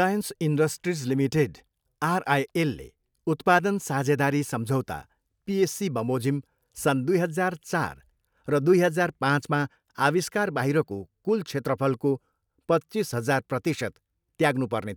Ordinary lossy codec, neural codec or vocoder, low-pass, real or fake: none; none; none; real